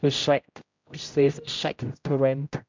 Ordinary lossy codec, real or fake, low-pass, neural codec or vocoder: MP3, 64 kbps; fake; 7.2 kHz; codec, 16 kHz, 0.5 kbps, X-Codec, HuBERT features, trained on general audio